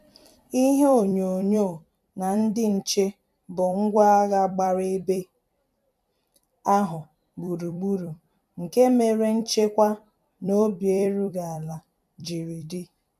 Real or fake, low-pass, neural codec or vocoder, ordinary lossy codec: fake; 14.4 kHz; vocoder, 44.1 kHz, 128 mel bands every 256 samples, BigVGAN v2; none